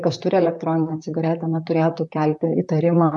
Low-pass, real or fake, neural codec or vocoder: 10.8 kHz; fake; vocoder, 44.1 kHz, 128 mel bands, Pupu-Vocoder